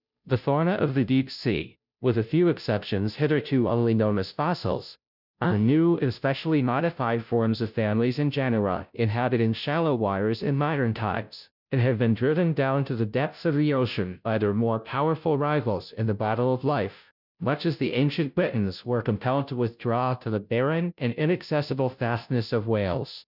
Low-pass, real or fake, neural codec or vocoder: 5.4 kHz; fake; codec, 16 kHz, 0.5 kbps, FunCodec, trained on Chinese and English, 25 frames a second